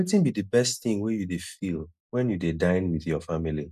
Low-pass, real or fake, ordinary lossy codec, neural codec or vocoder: 14.4 kHz; real; none; none